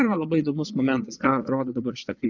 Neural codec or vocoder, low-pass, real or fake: vocoder, 22.05 kHz, 80 mel bands, Vocos; 7.2 kHz; fake